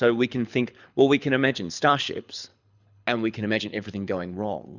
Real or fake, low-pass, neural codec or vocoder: fake; 7.2 kHz; codec, 24 kHz, 6 kbps, HILCodec